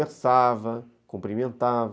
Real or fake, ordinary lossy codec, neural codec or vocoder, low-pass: real; none; none; none